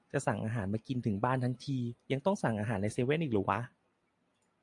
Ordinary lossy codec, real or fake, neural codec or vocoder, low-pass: AAC, 64 kbps; real; none; 10.8 kHz